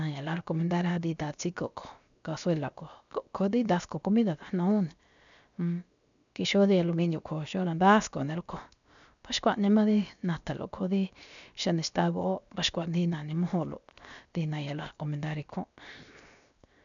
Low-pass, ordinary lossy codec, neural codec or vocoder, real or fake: 7.2 kHz; MP3, 96 kbps; codec, 16 kHz, 0.7 kbps, FocalCodec; fake